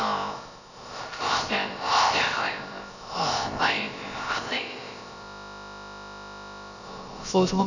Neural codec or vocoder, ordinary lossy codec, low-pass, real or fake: codec, 16 kHz, about 1 kbps, DyCAST, with the encoder's durations; none; 7.2 kHz; fake